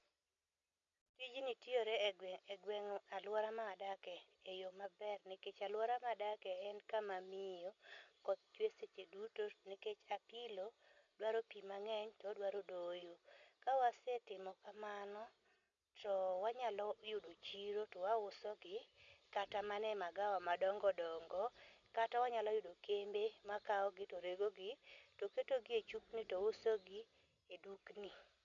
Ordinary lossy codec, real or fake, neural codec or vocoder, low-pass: none; real; none; 7.2 kHz